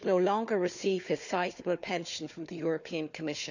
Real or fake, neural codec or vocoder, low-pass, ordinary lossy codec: fake; codec, 16 kHz, 4 kbps, FunCodec, trained on Chinese and English, 50 frames a second; 7.2 kHz; none